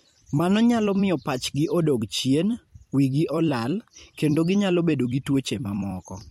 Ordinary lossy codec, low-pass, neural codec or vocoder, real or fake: MP3, 64 kbps; 19.8 kHz; vocoder, 44.1 kHz, 128 mel bands every 256 samples, BigVGAN v2; fake